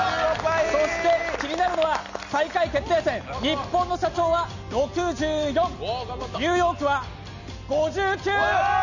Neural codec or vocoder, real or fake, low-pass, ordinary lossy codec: none; real; 7.2 kHz; none